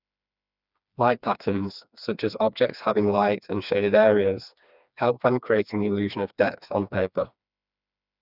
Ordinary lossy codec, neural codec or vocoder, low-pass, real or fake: none; codec, 16 kHz, 2 kbps, FreqCodec, smaller model; 5.4 kHz; fake